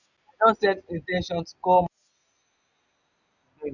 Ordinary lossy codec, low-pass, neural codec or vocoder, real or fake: none; 7.2 kHz; none; real